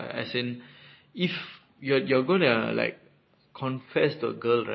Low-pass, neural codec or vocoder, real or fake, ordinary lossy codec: 7.2 kHz; none; real; MP3, 24 kbps